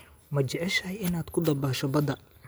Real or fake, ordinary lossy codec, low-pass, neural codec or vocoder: real; none; none; none